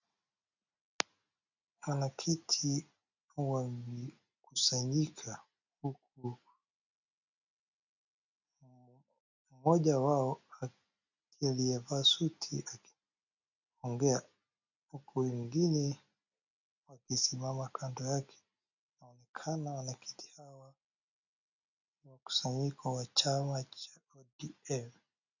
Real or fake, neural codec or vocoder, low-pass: real; none; 7.2 kHz